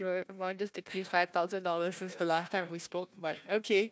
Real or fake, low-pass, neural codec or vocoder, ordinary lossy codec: fake; none; codec, 16 kHz, 1 kbps, FunCodec, trained on Chinese and English, 50 frames a second; none